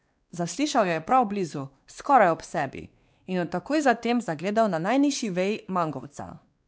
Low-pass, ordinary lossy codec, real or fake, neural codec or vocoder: none; none; fake; codec, 16 kHz, 2 kbps, X-Codec, WavLM features, trained on Multilingual LibriSpeech